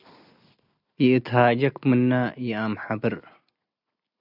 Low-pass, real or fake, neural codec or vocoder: 5.4 kHz; real; none